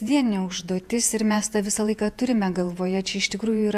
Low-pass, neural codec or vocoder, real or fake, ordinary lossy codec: 14.4 kHz; vocoder, 48 kHz, 128 mel bands, Vocos; fake; AAC, 96 kbps